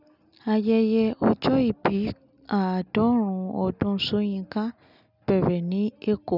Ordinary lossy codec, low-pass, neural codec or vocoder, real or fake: none; 5.4 kHz; none; real